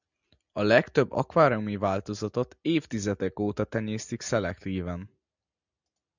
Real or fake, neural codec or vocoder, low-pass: real; none; 7.2 kHz